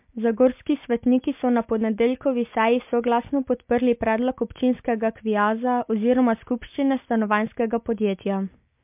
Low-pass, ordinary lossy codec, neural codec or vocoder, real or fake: 3.6 kHz; MP3, 32 kbps; none; real